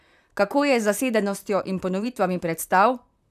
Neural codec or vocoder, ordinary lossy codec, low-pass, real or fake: vocoder, 44.1 kHz, 128 mel bands, Pupu-Vocoder; none; 14.4 kHz; fake